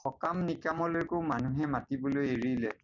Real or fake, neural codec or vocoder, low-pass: real; none; 7.2 kHz